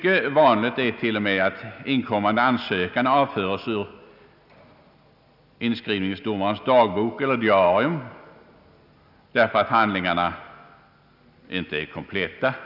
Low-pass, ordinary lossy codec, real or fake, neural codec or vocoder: 5.4 kHz; none; real; none